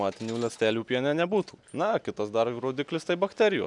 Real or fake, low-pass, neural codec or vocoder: real; 10.8 kHz; none